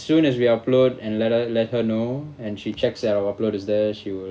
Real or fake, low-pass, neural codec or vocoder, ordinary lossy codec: real; none; none; none